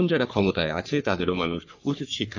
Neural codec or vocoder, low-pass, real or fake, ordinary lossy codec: codec, 44.1 kHz, 3.4 kbps, Pupu-Codec; 7.2 kHz; fake; none